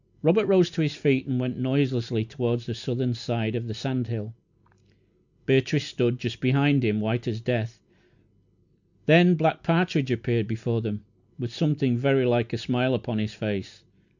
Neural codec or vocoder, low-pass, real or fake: none; 7.2 kHz; real